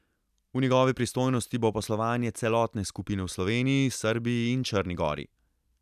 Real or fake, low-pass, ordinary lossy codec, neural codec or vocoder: real; 14.4 kHz; none; none